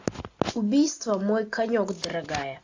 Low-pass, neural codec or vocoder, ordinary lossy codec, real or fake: 7.2 kHz; none; AAC, 48 kbps; real